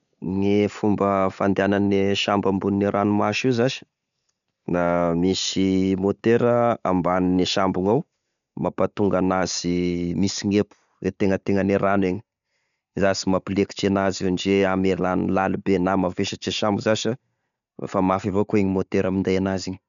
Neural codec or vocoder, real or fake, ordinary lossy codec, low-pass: none; real; none; 7.2 kHz